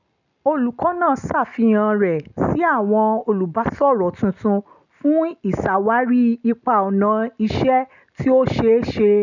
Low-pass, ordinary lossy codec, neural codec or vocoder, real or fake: 7.2 kHz; none; none; real